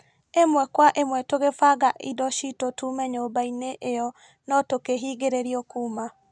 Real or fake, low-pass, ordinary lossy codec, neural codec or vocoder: real; none; none; none